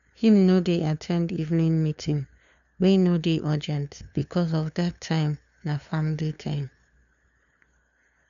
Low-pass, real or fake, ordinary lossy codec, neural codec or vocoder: 7.2 kHz; fake; none; codec, 16 kHz, 2 kbps, FunCodec, trained on LibriTTS, 25 frames a second